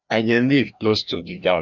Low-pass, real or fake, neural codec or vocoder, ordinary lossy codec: 7.2 kHz; fake; codec, 16 kHz, 1 kbps, FreqCodec, larger model; none